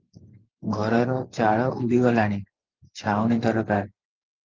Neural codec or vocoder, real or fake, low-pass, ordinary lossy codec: vocoder, 44.1 kHz, 128 mel bands every 512 samples, BigVGAN v2; fake; 7.2 kHz; Opus, 32 kbps